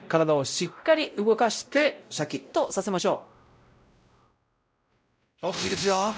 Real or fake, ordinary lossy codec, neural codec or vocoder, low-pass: fake; none; codec, 16 kHz, 0.5 kbps, X-Codec, WavLM features, trained on Multilingual LibriSpeech; none